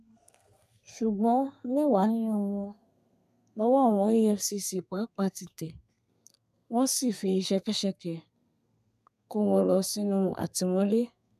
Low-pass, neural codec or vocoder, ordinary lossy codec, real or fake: 14.4 kHz; codec, 32 kHz, 1.9 kbps, SNAC; none; fake